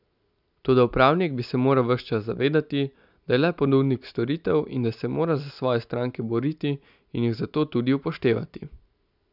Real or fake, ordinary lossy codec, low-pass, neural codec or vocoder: real; none; 5.4 kHz; none